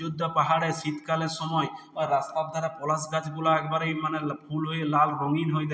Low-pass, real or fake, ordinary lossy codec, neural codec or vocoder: none; real; none; none